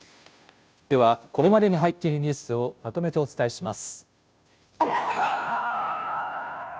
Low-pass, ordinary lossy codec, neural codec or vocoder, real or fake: none; none; codec, 16 kHz, 0.5 kbps, FunCodec, trained on Chinese and English, 25 frames a second; fake